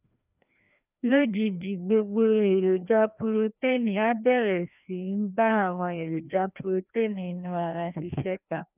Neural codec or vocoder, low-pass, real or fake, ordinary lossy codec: codec, 16 kHz, 1 kbps, FreqCodec, larger model; 3.6 kHz; fake; none